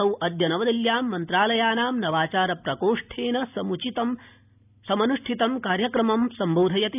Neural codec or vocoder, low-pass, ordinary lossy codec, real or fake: none; 3.6 kHz; none; real